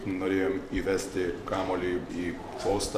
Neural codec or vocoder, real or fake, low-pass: vocoder, 44.1 kHz, 128 mel bands every 512 samples, BigVGAN v2; fake; 14.4 kHz